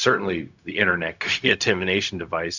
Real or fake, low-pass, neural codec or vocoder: fake; 7.2 kHz; codec, 16 kHz, 0.4 kbps, LongCat-Audio-Codec